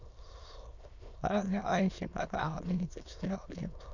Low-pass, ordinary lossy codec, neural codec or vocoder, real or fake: 7.2 kHz; none; autoencoder, 22.05 kHz, a latent of 192 numbers a frame, VITS, trained on many speakers; fake